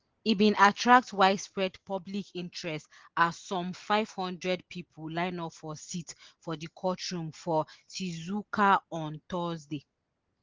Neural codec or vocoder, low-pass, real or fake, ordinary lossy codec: none; 7.2 kHz; real; Opus, 16 kbps